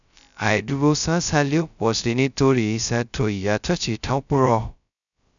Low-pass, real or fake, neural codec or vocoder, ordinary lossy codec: 7.2 kHz; fake; codec, 16 kHz, 0.2 kbps, FocalCodec; none